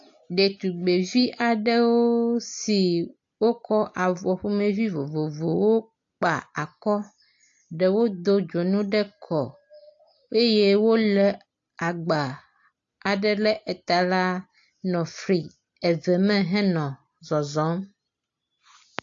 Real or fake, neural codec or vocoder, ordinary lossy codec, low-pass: real; none; AAC, 48 kbps; 7.2 kHz